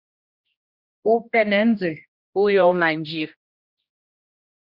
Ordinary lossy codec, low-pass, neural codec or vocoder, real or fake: Opus, 64 kbps; 5.4 kHz; codec, 16 kHz, 1 kbps, X-Codec, HuBERT features, trained on general audio; fake